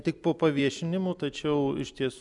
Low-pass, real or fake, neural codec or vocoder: 10.8 kHz; real; none